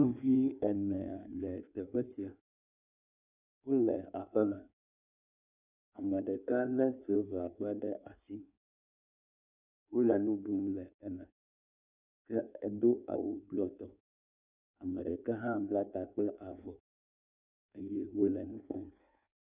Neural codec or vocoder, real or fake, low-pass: codec, 16 kHz in and 24 kHz out, 1.1 kbps, FireRedTTS-2 codec; fake; 3.6 kHz